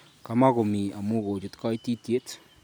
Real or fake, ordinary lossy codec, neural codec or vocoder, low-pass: real; none; none; none